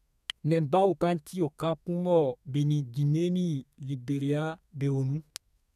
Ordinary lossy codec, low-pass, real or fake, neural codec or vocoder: none; 14.4 kHz; fake; codec, 32 kHz, 1.9 kbps, SNAC